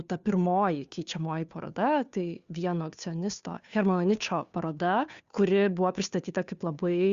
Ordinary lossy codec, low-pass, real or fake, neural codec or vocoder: Opus, 64 kbps; 7.2 kHz; fake; codec, 16 kHz, 2 kbps, FunCodec, trained on Chinese and English, 25 frames a second